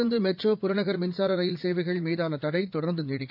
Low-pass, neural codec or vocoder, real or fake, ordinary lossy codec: 5.4 kHz; vocoder, 44.1 kHz, 80 mel bands, Vocos; fake; MP3, 48 kbps